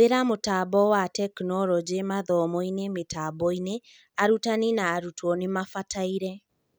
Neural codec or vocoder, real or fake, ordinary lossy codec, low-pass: none; real; none; none